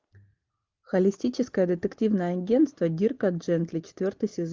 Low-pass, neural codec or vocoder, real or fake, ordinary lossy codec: 7.2 kHz; none; real; Opus, 24 kbps